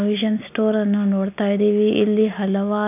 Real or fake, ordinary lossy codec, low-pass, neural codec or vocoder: real; none; 3.6 kHz; none